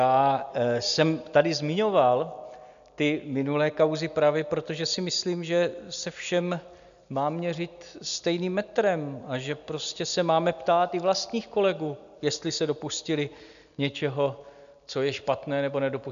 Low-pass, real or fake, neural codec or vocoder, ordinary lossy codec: 7.2 kHz; real; none; MP3, 96 kbps